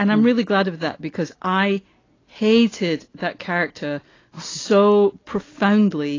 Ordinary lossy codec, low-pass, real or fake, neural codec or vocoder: AAC, 32 kbps; 7.2 kHz; real; none